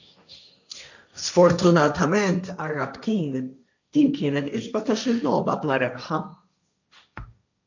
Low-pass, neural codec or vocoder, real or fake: 7.2 kHz; codec, 16 kHz, 1.1 kbps, Voila-Tokenizer; fake